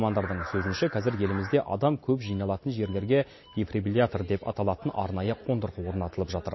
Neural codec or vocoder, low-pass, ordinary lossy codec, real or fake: none; 7.2 kHz; MP3, 24 kbps; real